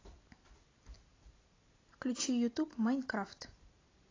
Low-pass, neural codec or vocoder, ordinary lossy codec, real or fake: 7.2 kHz; none; AAC, 32 kbps; real